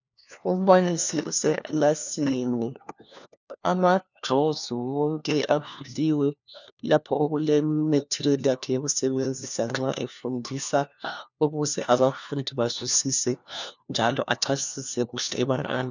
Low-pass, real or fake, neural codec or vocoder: 7.2 kHz; fake; codec, 16 kHz, 1 kbps, FunCodec, trained on LibriTTS, 50 frames a second